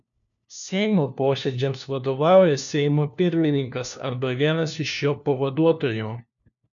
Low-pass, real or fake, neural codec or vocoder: 7.2 kHz; fake; codec, 16 kHz, 1 kbps, FunCodec, trained on LibriTTS, 50 frames a second